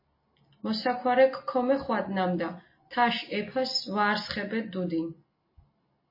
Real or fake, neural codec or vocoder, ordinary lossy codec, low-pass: real; none; MP3, 24 kbps; 5.4 kHz